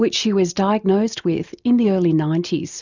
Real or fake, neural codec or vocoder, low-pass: real; none; 7.2 kHz